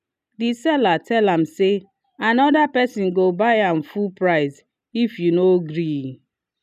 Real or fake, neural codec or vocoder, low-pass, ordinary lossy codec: real; none; 14.4 kHz; none